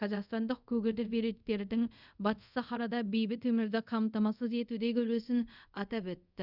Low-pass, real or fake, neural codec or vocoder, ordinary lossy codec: 5.4 kHz; fake; codec, 24 kHz, 0.5 kbps, DualCodec; Opus, 64 kbps